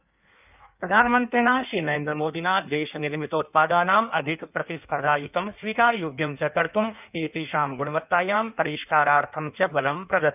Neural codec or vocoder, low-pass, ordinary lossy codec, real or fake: codec, 16 kHz in and 24 kHz out, 1.1 kbps, FireRedTTS-2 codec; 3.6 kHz; none; fake